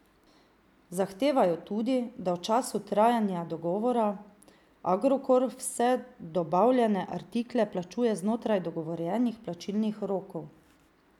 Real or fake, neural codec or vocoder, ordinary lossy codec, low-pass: real; none; none; 19.8 kHz